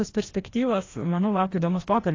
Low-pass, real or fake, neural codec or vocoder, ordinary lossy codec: 7.2 kHz; fake; codec, 16 kHz, 1 kbps, FreqCodec, larger model; AAC, 32 kbps